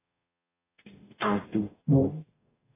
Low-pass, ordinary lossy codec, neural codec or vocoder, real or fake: 3.6 kHz; AAC, 16 kbps; codec, 44.1 kHz, 0.9 kbps, DAC; fake